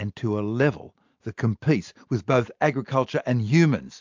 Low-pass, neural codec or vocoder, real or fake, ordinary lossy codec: 7.2 kHz; none; real; MP3, 64 kbps